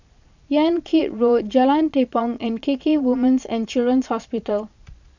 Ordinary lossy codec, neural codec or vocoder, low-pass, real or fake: none; vocoder, 22.05 kHz, 80 mel bands, Vocos; 7.2 kHz; fake